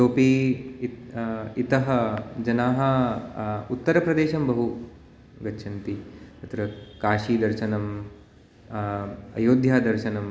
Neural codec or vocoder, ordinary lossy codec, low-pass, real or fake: none; none; none; real